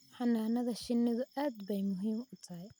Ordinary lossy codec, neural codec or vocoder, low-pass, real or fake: none; none; none; real